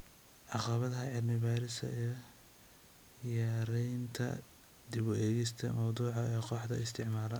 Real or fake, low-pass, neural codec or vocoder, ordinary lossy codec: real; none; none; none